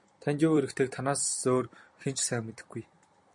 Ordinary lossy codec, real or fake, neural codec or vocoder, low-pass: MP3, 48 kbps; fake; vocoder, 44.1 kHz, 128 mel bands every 256 samples, BigVGAN v2; 10.8 kHz